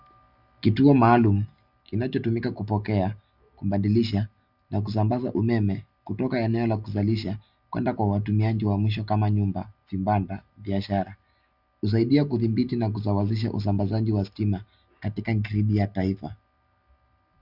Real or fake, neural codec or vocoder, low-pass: real; none; 5.4 kHz